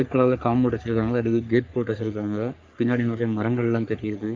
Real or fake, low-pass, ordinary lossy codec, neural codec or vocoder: fake; 7.2 kHz; Opus, 24 kbps; codec, 44.1 kHz, 3.4 kbps, Pupu-Codec